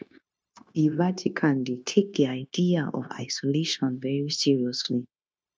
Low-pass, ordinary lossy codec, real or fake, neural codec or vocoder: none; none; fake; codec, 16 kHz, 0.9 kbps, LongCat-Audio-Codec